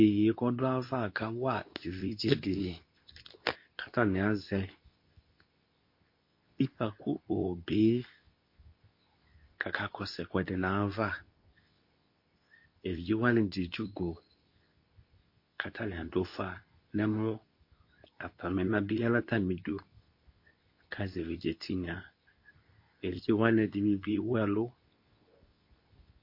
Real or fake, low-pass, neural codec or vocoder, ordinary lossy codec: fake; 5.4 kHz; codec, 24 kHz, 0.9 kbps, WavTokenizer, medium speech release version 2; MP3, 32 kbps